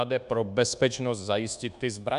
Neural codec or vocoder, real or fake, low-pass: codec, 24 kHz, 1.2 kbps, DualCodec; fake; 10.8 kHz